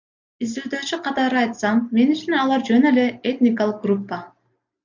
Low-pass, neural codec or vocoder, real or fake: 7.2 kHz; none; real